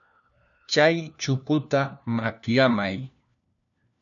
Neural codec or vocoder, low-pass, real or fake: codec, 16 kHz, 1 kbps, FunCodec, trained on LibriTTS, 50 frames a second; 7.2 kHz; fake